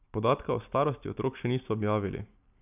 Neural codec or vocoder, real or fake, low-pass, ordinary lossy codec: none; real; 3.6 kHz; none